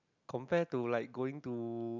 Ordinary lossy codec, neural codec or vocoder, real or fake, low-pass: none; none; real; 7.2 kHz